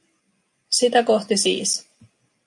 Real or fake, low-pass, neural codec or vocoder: real; 10.8 kHz; none